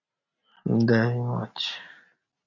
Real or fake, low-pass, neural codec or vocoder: real; 7.2 kHz; none